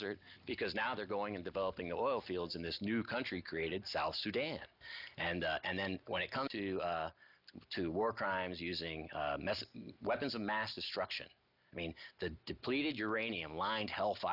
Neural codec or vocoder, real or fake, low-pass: none; real; 5.4 kHz